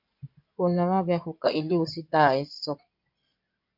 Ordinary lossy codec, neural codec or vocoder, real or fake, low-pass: MP3, 48 kbps; codec, 16 kHz in and 24 kHz out, 2.2 kbps, FireRedTTS-2 codec; fake; 5.4 kHz